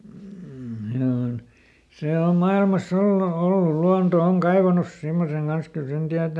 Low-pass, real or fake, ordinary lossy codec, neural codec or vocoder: none; real; none; none